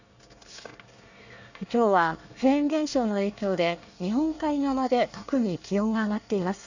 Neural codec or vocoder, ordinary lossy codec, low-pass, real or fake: codec, 24 kHz, 1 kbps, SNAC; none; 7.2 kHz; fake